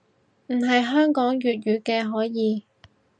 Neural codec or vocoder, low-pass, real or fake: none; 9.9 kHz; real